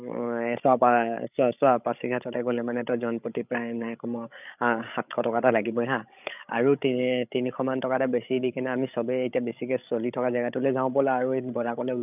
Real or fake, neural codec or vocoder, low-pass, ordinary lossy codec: fake; codec, 16 kHz, 16 kbps, FreqCodec, larger model; 3.6 kHz; none